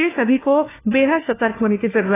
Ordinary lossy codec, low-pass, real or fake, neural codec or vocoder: AAC, 16 kbps; 3.6 kHz; fake; codec, 16 kHz, 1 kbps, FunCodec, trained on LibriTTS, 50 frames a second